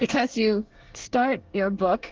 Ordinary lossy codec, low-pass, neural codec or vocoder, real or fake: Opus, 16 kbps; 7.2 kHz; codec, 24 kHz, 1 kbps, SNAC; fake